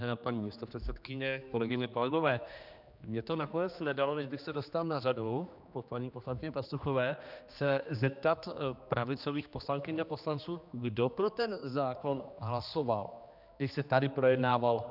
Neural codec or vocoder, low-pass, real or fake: codec, 16 kHz, 2 kbps, X-Codec, HuBERT features, trained on general audio; 5.4 kHz; fake